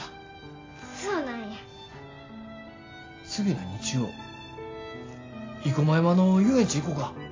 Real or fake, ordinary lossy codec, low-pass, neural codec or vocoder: real; AAC, 32 kbps; 7.2 kHz; none